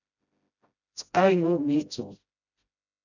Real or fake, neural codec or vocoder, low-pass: fake; codec, 16 kHz, 0.5 kbps, FreqCodec, smaller model; 7.2 kHz